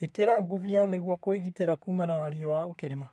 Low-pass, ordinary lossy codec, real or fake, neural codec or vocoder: none; none; fake; codec, 24 kHz, 1 kbps, SNAC